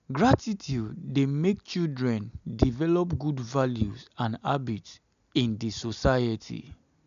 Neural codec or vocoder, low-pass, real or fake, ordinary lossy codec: none; 7.2 kHz; real; none